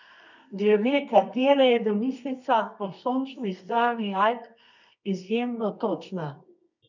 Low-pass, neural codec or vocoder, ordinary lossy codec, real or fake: 7.2 kHz; codec, 24 kHz, 0.9 kbps, WavTokenizer, medium music audio release; none; fake